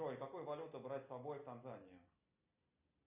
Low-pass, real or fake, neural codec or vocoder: 3.6 kHz; real; none